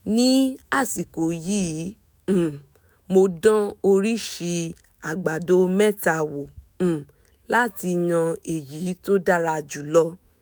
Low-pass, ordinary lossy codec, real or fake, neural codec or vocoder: none; none; fake; autoencoder, 48 kHz, 128 numbers a frame, DAC-VAE, trained on Japanese speech